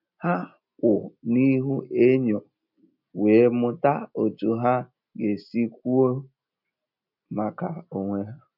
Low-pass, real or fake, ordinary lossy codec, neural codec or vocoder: 5.4 kHz; real; none; none